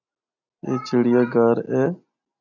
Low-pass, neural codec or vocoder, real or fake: 7.2 kHz; none; real